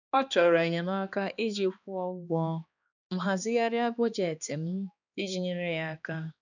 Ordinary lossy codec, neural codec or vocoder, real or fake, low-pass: none; codec, 16 kHz, 2 kbps, X-Codec, HuBERT features, trained on balanced general audio; fake; 7.2 kHz